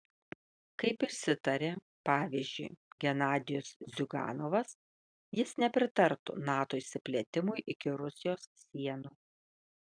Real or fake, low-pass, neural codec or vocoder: real; 9.9 kHz; none